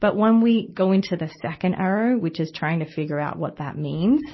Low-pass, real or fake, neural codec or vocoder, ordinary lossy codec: 7.2 kHz; real; none; MP3, 24 kbps